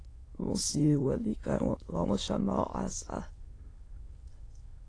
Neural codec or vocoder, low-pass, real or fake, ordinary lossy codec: autoencoder, 22.05 kHz, a latent of 192 numbers a frame, VITS, trained on many speakers; 9.9 kHz; fake; AAC, 32 kbps